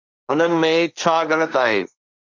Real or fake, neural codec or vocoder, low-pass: fake; codec, 16 kHz, 1.1 kbps, Voila-Tokenizer; 7.2 kHz